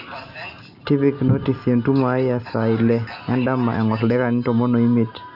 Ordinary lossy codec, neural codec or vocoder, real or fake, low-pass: none; none; real; 5.4 kHz